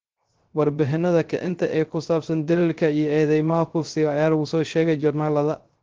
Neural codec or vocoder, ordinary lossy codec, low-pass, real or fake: codec, 16 kHz, 0.3 kbps, FocalCodec; Opus, 16 kbps; 7.2 kHz; fake